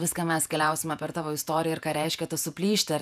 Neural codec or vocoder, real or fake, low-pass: vocoder, 48 kHz, 128 mel bands, Vocos; fake; 14.4 kHz